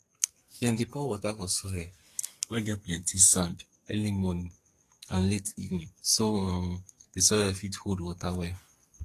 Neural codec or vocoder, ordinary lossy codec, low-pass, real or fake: codec, 44.1 kHz, 2.6 kbps, SNAC; AAC, 48 kbps; 14.4 kHz; fake